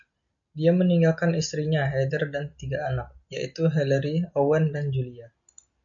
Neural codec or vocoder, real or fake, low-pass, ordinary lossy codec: none; real; 7.2 kHz; MP3, 64 kbps